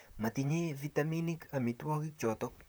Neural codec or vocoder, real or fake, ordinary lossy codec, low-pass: vocoder, 44.1 kHz, 128 mel bands, Pupu-Vocoder; fake; none; none